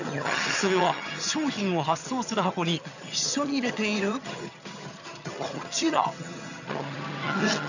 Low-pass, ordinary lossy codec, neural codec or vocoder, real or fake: 7.2 kHz; none; vocoder, 22.05 kHz, 80 mel bands, HiFi-GAN; fake